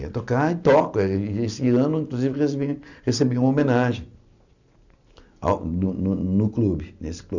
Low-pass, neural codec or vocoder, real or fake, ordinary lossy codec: 7.2 kHz; none; real; none